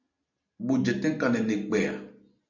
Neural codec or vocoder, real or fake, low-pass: none; real; 7.2 kHz